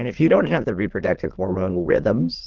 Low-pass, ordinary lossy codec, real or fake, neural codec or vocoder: 7.2 kHz; Opus, 16 kbps; fake; autoencoder, 22.05 kHz, a latent of 192 numbers a frame, VITS, trained on many speakers